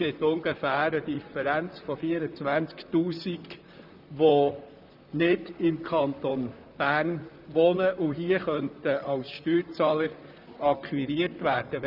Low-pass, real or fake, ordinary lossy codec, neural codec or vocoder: 5.4 kHz; fake; none; vocoder, 44.1 kHz, 128 mel bands, Pupu-Vocoder